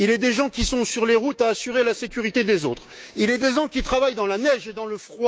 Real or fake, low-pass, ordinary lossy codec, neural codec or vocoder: fake; none; none; codec, 16 kHz, 6 kbps, DAC